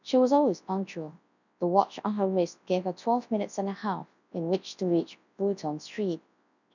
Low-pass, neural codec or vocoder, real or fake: 7.2 kHz; codec, 24 kHz, 0.9 kbps, WavTokenizer, large speech release; fake